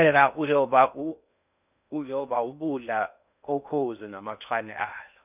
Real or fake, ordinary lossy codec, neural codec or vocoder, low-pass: fake; none; codec, 16 kHz in and 24 kHz out, 0.6 kbps, FocalCodec, streaming, 4096 codes; 3.6 kHz